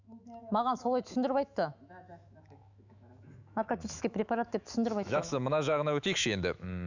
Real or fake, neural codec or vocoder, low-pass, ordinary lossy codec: fake; autoencoder, 48 kHz, 128 numbers a frame, DAC-VAE, trained on Japanese speech; 7.2 kHz; none